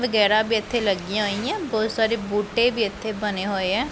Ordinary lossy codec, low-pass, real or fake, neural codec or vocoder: none; none; real; none